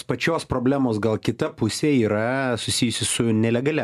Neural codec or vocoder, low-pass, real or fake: none; 14.4 kHz; real